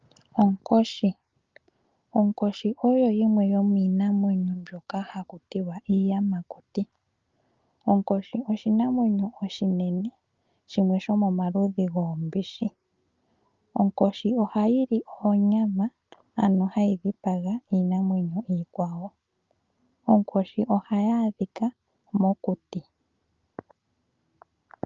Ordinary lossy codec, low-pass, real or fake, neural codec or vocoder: Opus, 24 kbps; 7.2 kHz; real; none